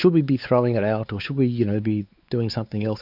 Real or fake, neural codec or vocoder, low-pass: fake; codec, 16 kHz, 4 kbps, X-Codec, WavLM features, trained on Multilingual LibriSpeech; 5.4 kHz